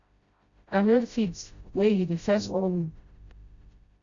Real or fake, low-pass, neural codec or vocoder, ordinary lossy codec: fake; 7.2 kHz; codec, 16 kHz, 0.5 kbps, FreqCodec, smaller model; Opus, 64 kbps